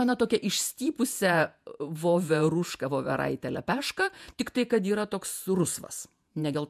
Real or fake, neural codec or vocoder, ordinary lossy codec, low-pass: fake; vocoder, 48 kHz, 128 mel bands, Vocos; MP3, 96 kbps; 14.4 kHz